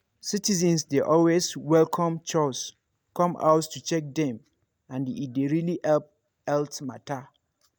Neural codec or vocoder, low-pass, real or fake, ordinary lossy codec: none; none; real; none